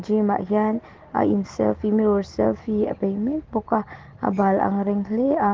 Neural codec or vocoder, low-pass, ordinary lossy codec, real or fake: none; 7.2 kHz; Opus, 16 kbps; real